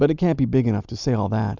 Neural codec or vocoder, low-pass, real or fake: none; 7.2 kHz; real